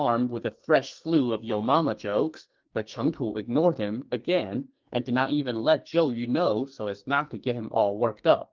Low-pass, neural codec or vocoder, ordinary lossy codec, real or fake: 7.2 kHz; codec, 44.1 kHz, 2.6 kbps, SNAC; Opus, 32 kbps; fake